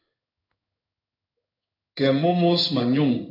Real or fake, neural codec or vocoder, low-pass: fake; codec, 16 kHz in and 24 kHz out, 1 kbps, XY-Tokenizer; 5.4 kHz